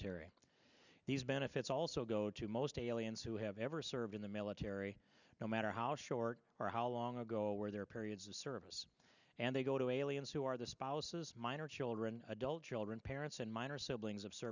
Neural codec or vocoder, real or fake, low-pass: none; real; 7.2 kHz